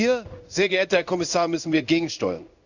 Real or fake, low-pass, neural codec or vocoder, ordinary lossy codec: fake; 7.2 kHz; codec, 16 kHz in and 24 kHz out, 1 kbps, XY-Tokenizer; none